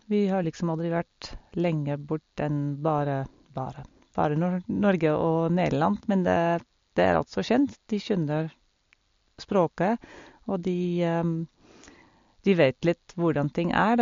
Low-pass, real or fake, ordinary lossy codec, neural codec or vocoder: 7.2 kHz; real; MP3, 48 kbps; none